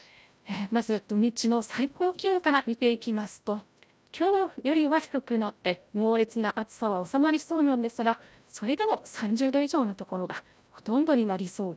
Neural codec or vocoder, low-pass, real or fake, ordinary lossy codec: codec, 16 kHz, 0.5 kbps, FreqCodec, larger model; none; fake; none